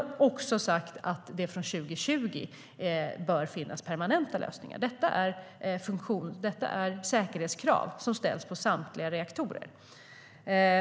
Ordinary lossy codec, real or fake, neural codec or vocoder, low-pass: none; real; none; none